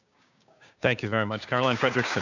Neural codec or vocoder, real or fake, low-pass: codec, 16 kHz, 6 kbps, DAC; fake; 7.2 kHz